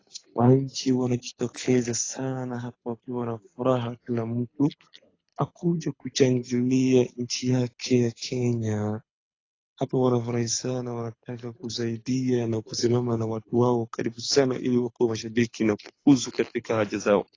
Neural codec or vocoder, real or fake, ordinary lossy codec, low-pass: codec, 24 kHz, 6 kbps, HILCodec; fake; AAC, 32 kbps; 7.2 kHz